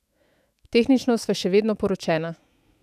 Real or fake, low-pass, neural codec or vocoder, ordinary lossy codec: fake; 14.4 kHz; autoencoder, 48 kHz, 128 numbers a frame, DAC-VAE, trained on Japanese speech; none